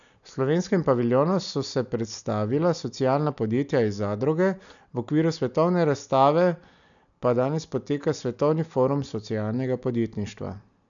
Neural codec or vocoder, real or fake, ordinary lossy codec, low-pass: none; real; none; 7.2 kHz